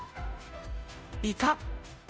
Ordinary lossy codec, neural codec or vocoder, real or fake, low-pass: none; codec, 16 kHz, 0.5 kbps, FunCodec, trained on Chinese and English, 25 frames a second; fake; none